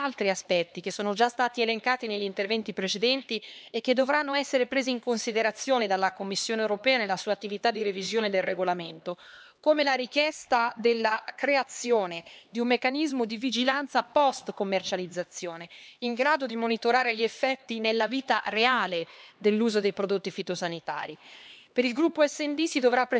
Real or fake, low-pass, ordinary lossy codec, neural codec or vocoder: fake; none; none; codec, 16 kHz, 2 kbps, X-Codec, HuBERT features, trained on LibriSpeech